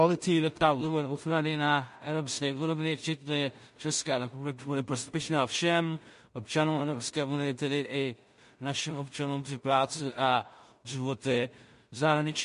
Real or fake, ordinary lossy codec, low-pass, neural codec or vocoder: fake; MP3, 48 kbps; 10.8 kHz; codec, 16 kHz in and 24 kHz out, 0.4 kbps, LongCat-Audio-Codec, two codebook decoder